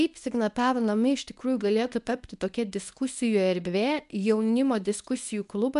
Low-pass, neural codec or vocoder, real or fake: 10.8 kHz; codec, 24 kHz, 0.9 kbps, WavTokenizer, medium speech release version 1; fake